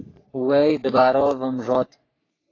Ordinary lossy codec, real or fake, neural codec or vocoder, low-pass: AAC, 32 kbps; fake; codec, 44.1 kHz, 3.4 kbps, Pupu-Codec; 7.2 kHz